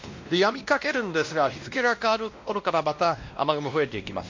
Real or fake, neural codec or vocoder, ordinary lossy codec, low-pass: fake; codec, 16 kHz, 1 kbps, X-Codec, WavLM features, trained on Multilingual LibriSpeech; MP3, 48 kbps; 7.2 kHz